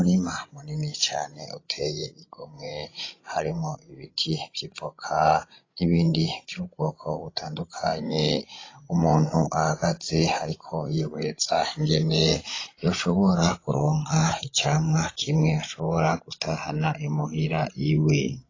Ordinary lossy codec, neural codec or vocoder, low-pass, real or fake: AAC, 32 kbps; codec, 16 kHz, 16 kbps, FreqCodec, larger model; 7.2 kHz; fake